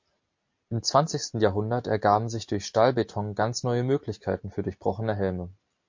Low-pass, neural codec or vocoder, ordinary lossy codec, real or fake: 7.2 kHz; none; MP3, 48 kbps; real